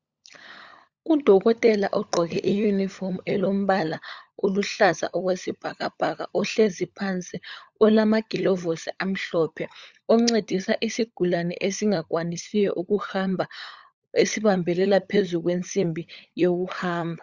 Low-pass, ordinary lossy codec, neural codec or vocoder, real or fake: 7.2 kHz; Opus, 64 kbps; codec, 16 kHz, 16 kbps, FunCodec, trained on LibriTTS, 50 frames a second; fake